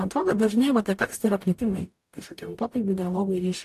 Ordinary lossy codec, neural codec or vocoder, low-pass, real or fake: AAC, 64 kbps; codec, 44.1 kHz, 0.9 kbps, DAC; 14.4 kHz; fake